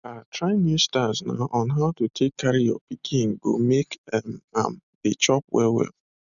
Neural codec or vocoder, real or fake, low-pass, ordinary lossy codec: none; real; 7.2 kHz; none